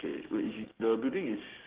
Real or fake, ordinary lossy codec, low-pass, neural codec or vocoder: fake; Opus, 16 kbps; 3.6 kHz; codec, 16 kHz, 6 kbps, DAC